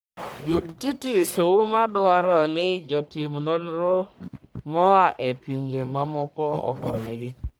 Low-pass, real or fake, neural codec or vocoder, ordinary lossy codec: none; fake; codec, 44.1 kHz, 1.7 kbps, Pupu-Codec; none